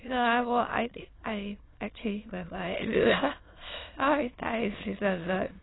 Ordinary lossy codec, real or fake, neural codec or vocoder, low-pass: AAC, 16 kbps; fake; autoencoder, 22.05 kHz, a latent of 192 numbers a frame, VITS, trained on many speakers; 7.2 kHz